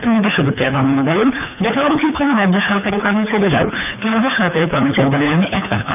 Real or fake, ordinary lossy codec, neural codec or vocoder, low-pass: fake; none; codec, 24 kHz, 3 kbps, HILCodec; 3.6 kHz